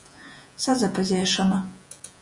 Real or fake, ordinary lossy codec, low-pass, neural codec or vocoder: fake; MP3, 96 kbps; 10.8 kHz; vocoder, 48 kHz, 128 mel bands, Vocos